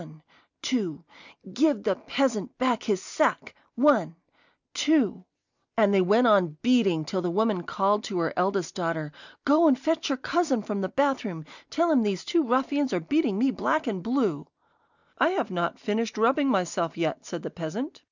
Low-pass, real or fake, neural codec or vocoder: 7.2 kHz; real; none